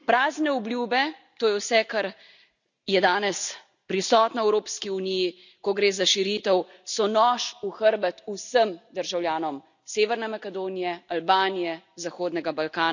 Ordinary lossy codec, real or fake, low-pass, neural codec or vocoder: none; real; 7.2 kHz; none